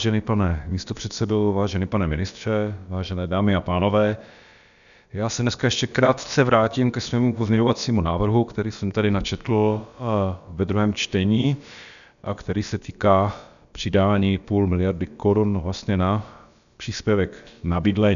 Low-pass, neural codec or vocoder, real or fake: 7.2 kHz; codec, 16 kHz, about 1 kbps, DyCAST, with the encoder's durations; fake